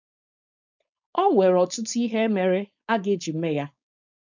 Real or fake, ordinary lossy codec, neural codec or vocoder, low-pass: fake; none; codec, 16 kHz, 4.8 kbps, FACodec; 7.2 kHz